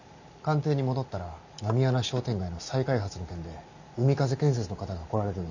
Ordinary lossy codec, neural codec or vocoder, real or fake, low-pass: none; none; real; 7.2 kHz